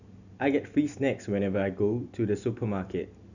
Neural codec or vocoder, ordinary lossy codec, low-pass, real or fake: none; none; 7.2 kHz; real